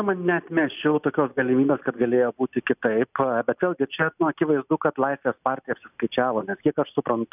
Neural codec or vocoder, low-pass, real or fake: none; 3.6 kHz; real